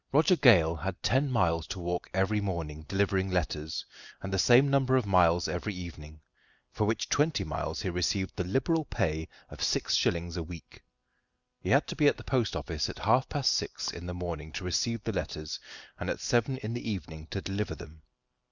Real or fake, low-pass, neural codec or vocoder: real; 7.2 kHz; none